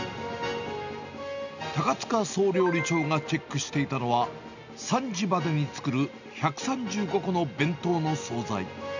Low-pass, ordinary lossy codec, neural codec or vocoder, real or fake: 7.2 kHz; none; none; real